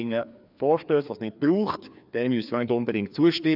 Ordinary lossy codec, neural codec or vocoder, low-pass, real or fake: none; codec, 16 kHz, 2 kbps, FreqCodec, larger model; 5.4 kHz; fake